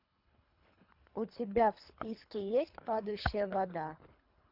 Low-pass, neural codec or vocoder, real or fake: 5.4 kHz; codec, 24 kHz, 3 kbps, HILCodec; fake